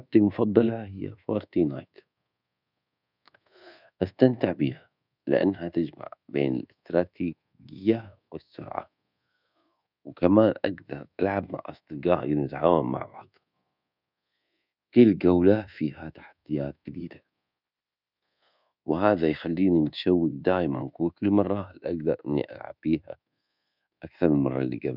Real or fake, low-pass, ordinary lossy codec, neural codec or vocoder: fake; 5.4 kHz; none; codec, 24 kHz, 1.2 kbps, DualCodec